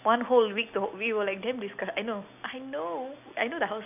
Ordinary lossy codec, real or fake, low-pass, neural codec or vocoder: none; real; 3.6 kHz; none